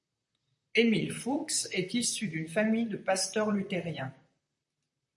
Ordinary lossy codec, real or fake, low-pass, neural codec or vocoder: MP3, 96 kbps; fake; 10.8 kHz; vocoder, 44.1 kHz, 128 mel bands, Pupu-Vocoder